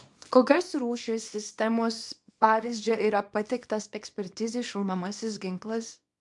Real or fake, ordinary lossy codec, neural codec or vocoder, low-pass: fake; MP3, 64 kbps; codec, 24 kHz, 0.9 kbps, WavTokenizer, small release; 10.8 kHz